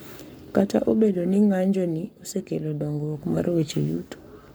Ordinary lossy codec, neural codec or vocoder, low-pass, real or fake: none; codec, 44.1 kHz, 7.8 kbps, Pupu-Codec; none; fake